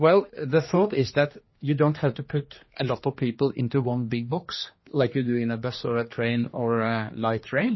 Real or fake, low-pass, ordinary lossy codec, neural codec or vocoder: fake; 7.2 kHz; MP3, 24 kbps; codec, 16 kHz, 2 kbps, X-Codec, HuBERT features, trained on general audio